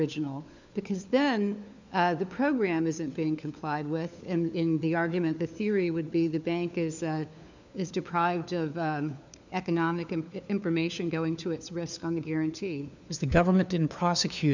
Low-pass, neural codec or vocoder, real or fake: 7.2 kHz; codec, 16 kHz, 4 kbps, FunCodec, trained on LibriTTS, 50 frames a second; fake